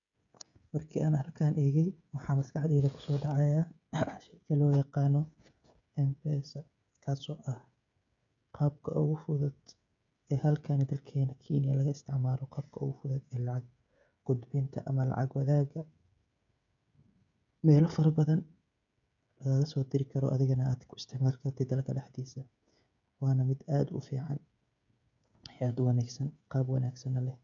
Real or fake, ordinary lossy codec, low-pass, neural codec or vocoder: fake; none; 7.2 kHz; codec, 16 kHz, 16 kbps, FreqCodec, smaller model